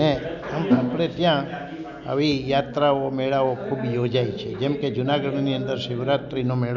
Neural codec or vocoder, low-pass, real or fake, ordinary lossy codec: none; 7.2 kHz; real; none